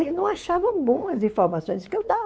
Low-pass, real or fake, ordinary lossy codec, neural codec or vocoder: none; fake; none; codec, 16 kHz, 4 kbps, X-Codec, WavLM features, trained on Multilingual LibriSpeech